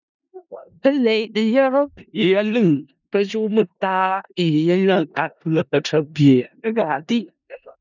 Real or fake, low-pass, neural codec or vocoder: fake; 7.2 kHz; codec, 16 kHz in and 24 kHz out, 0.4 kbps, LongCat-Audio-Codec, four codebook decoder